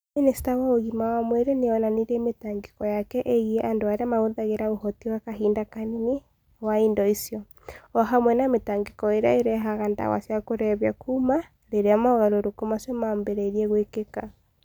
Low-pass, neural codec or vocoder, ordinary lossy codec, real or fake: none; none; none; real